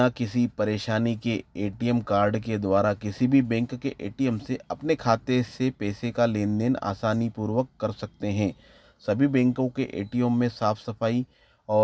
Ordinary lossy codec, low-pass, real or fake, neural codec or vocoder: none; none; real; none